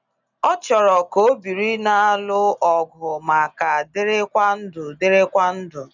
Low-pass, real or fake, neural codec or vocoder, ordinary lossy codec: 7.2 kHz; real; none; none